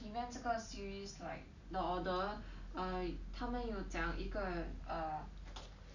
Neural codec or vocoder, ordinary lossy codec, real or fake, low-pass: none; none; real; 7.2 kHz